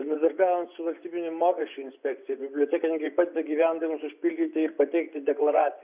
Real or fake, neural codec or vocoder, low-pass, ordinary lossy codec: fake; vocoder, 24 kHz, 100 mel bands, Vocos; 3.6 kHz; Opus, 64 kbps